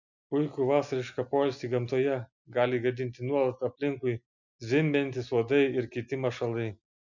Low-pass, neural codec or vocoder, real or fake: 7.2 kHz; none; real